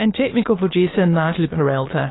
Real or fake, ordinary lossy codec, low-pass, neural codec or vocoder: fake; AAC, 16 kbps; 7.2 kHz; autoencoder, 22.05 kHz, a latent of 192 numbers a frame, VITS, trained on many speakers